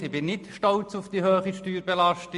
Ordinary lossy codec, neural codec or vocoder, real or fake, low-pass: MP3, 48 kbps; none; real; 14.4 kHz